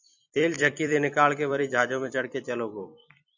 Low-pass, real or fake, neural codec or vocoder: 7.2 kHz; fake; vocoder, 44.1 kHz, 128 mel bands every 256 samples, BigVGAN v2